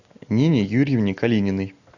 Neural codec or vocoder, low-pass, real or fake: none; 7.2 kHz; real